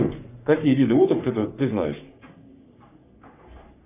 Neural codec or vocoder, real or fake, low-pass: codec, 44.1 kHz, 3.4 kbps, Pupu-Codec; fake; 3.6 kHz